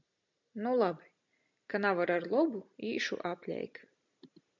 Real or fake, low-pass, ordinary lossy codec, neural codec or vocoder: real; 7.2 kHz; MP3, 64 kbps; none